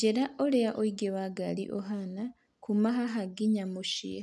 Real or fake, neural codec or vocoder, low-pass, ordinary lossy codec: real; none; none; none